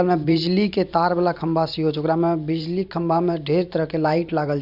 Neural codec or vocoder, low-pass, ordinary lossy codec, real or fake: vocoder, 44.1 kHz, 128 mel bands every 512 samples, BigVGAN v2; 5.4 kHz; none; fake